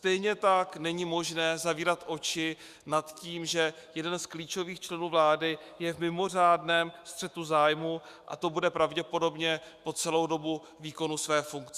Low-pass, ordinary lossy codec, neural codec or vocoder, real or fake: 14.4 kHz; Opus, 64 kbps; autoencoder, 48 kHz, 128 numbers a frame, DAC-VAE, trained on Japanese speech; fake